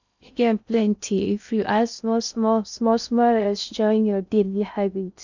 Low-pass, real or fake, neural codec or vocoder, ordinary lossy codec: 7.2 kHz; fake; codec, 16 kHz in and 24 kHz out, 0.6 kbps, FocalCodec, streaming, 2048 codes; none